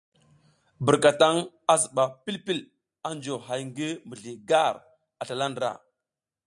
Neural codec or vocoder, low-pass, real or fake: none; 10.8 kHz; real